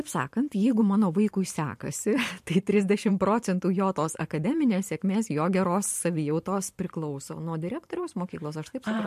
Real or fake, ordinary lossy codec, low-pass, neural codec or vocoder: fake; MP3, 64 kbps; 14.4 kHz; vocoder, 44.1 kHz, 128 mel bands every 512 samples, BigVGAN v2